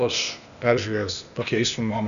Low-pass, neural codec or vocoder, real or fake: 7.2 kHz; codec, 16 kHz, 0.8 kbps, ZipCodec; fake